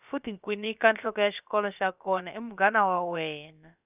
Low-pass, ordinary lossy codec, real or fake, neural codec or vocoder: 3.6 kHz; none; fake; codec, 16 kHz, about 1 kbps, DyCAST, with the encoder's durations